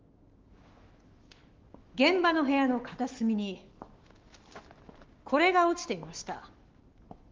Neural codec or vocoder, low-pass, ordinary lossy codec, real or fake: autoencoder, 48 kHz, 128 numbers a frame, DAC-VAE, trained on Japanese speech; 7.2 kHz; Opus, 16 kbps; fake